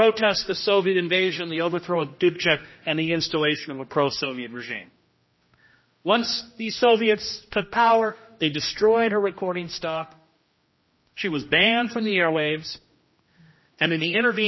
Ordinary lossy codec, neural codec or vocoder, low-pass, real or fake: MP3, 24 kbps; codec, 16 kHz, 1 kbps, X-Codec, HuBERT features, trained on general audio; 7.2 kHz; fake